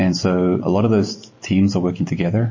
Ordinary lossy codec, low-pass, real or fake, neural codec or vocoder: MP3, 32 kbps; 7.2 kHz; real; none